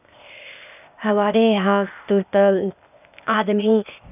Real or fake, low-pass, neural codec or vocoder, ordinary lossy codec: fake; 3.6 kHz; codec, 16 kHz, 0.8 kbps, ZipCodec; none